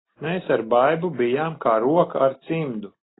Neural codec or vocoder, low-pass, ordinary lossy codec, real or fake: none; 7.2 kHz; AAC, 16 kbps; real